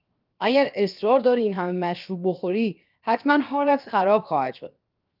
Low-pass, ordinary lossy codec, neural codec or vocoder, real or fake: 5.4 kHz; Opus, 24 kbps; codec, 16 kHz, 0.7 kbps, FocalCodec; fake